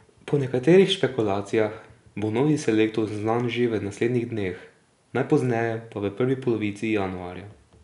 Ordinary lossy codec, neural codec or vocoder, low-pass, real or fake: none; none; 10.8 kHz; real